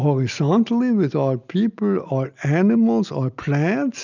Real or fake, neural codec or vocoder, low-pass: real; none; 7.2 kHz